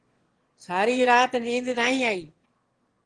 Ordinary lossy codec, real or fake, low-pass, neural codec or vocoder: Opus, 16 kbps; fake; 9.9 kHz; autoencoder, 22.05 kHz, a latent of 192 numbers a frame, VITS, trained on one speaker